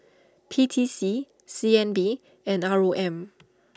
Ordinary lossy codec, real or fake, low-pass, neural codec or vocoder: none; real; none; none